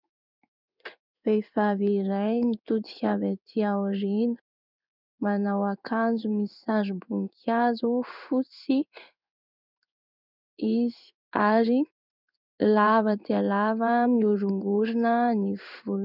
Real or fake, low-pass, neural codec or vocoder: fake; 5.4 kHz; codec, 16 kHz in and 24 kHz out, 1 kbps, XY-Tokenizer